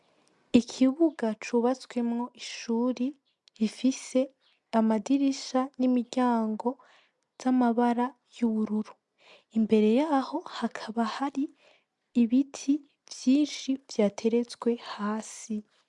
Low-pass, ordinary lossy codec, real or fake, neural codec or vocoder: 10.8 kHz; MP3, 96 kbps; real; none